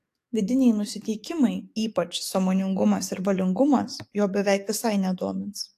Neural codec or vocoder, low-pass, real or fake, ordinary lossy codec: codec, 44.1 kHz, 7.8 kbps, DAC; 14.4 kHz; fake; AAC, 64 kbps